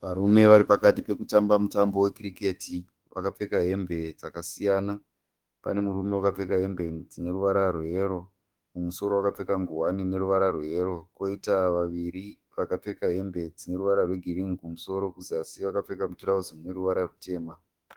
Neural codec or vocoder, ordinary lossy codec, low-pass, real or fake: autoencoder, 48 kHz, 32 numbers a frame, DAC-VAE, trained on Japanese speech; Opus, 24 kbps; 19.8 kHz; fake